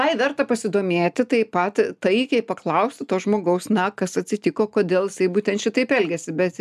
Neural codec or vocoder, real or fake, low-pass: none; real; 14.4 kHz